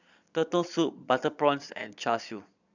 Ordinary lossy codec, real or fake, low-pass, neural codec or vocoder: none; fake; 7.2 kHz; vocoder, 22.05 kHz, 80 mel bands, Vocos